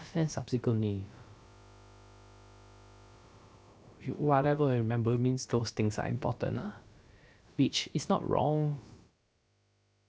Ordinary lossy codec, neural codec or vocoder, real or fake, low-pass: none; codec, 16 kHz, about 1 kbps, DyCAST, with the encoder's durations; fake; none